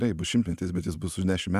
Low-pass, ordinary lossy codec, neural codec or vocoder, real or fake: 14.4 kHz; Opus, 64 kbps; none; real